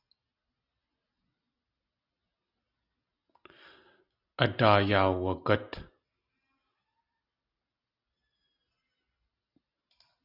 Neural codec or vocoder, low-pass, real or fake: none; 5.4 kHz; real